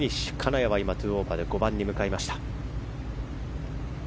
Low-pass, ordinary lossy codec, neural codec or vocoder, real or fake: none; none; none; real